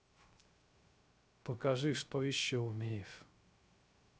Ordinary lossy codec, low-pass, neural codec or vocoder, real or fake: none; none; codec, 16 kHz, 0.3 kbps, FocalCodec; fake